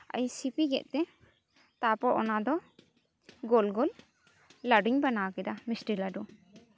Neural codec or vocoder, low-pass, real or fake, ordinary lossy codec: none; none; real; none